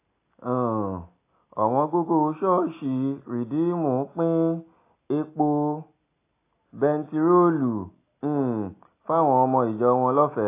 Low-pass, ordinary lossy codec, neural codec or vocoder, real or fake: 3.6 kHz; AAC, 24 kbps; none; real